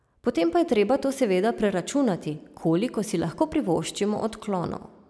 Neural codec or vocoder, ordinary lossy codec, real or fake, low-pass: none; none; real; none